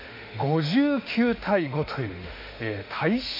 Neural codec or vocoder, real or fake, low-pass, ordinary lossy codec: autoencoder, 48 kHz, 32 numbers a frame, DAC-VAE, trained on Japanese speech; fake; 5.4 kHz; MP3, 48 kbps